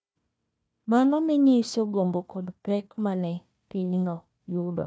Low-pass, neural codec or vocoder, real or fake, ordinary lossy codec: none; codec, 16 kHz, 1 kbps, FunCodec, trained on Chinese and English, 50 frames a second; fake; none